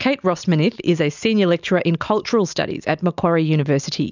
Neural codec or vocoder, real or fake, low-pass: none; real; 7.2 kHz